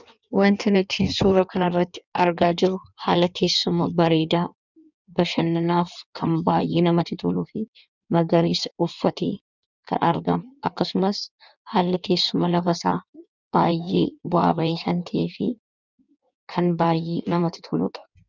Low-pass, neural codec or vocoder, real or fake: 7.2 kHz; codec, 16 kHz in and 24 kHz out, 1.1 kbps, FireRedTTS-2 codec; fake